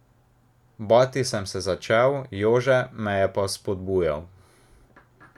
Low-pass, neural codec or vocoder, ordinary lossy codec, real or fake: 19.8 kHz; none; MP3, 96 kbps; real